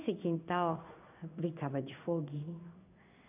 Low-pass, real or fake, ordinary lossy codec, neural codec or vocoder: 3.6 kHz; fake; none; codec, 16 kHz in and 24 kHz out, 1 kbps, XY-Tokenizer